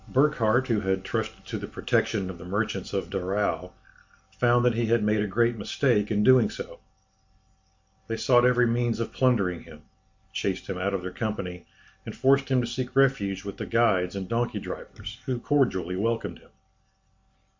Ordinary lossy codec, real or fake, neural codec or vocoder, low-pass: MP3, 48 kbps; real; none; 7.2 kHz